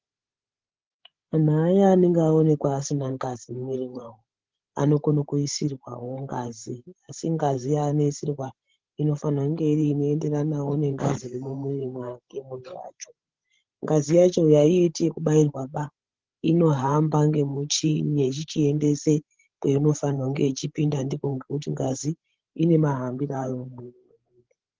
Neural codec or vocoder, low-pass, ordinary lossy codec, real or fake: codec, 16 kHz, 16 kbps, FreqCodec, larger model; 7.2 kHz; Opus, 16 kbps; fake